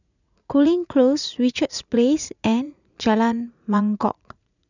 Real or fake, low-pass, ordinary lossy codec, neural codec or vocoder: fake; 7.2 kHz; none; vocoder, 44.1 kHz, 80 mel bands, Vocos